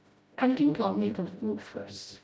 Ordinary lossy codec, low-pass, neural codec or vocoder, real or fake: none; none; codec, 16 kHz, 0.5 kbps, FreqCodec, smaller model; fake